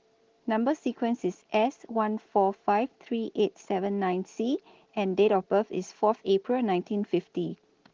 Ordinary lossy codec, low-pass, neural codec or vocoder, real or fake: Opus, 16 kbps; 7.2 kHz; none; real